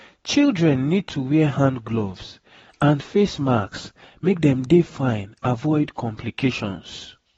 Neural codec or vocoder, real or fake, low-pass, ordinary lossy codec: none; real; 19.8 kHz; AAC, 24 kbps